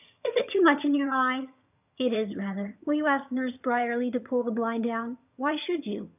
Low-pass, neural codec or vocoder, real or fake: 3.6 kHz; vocoder, 22.05 kHz, 80 mel bands, HiFi-GAN; fake